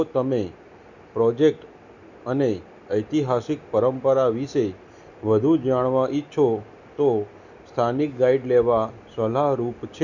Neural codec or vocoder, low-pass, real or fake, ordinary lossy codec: none; 7.2 kHz; real; none